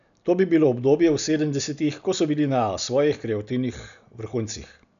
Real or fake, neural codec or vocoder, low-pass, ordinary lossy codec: real; none; 7.2 kHz; none